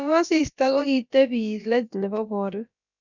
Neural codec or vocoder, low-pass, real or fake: codec, 16 kHz, about 1 kbps, DyCAST, with the encoder's durations; 7.2 kHz; fake